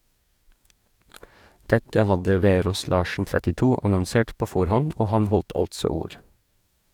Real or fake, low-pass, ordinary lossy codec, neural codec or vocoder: fake; 19.8 kHz; none; codec, 44.1 kHz, 2.6 kbps, DAC